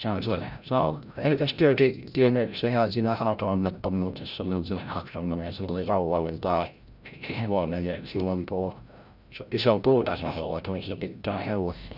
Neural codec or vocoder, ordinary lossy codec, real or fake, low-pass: codec, 16 kHz, 0.5 kbps, FreqCodec, larger model; none; fake; 5.4 kHz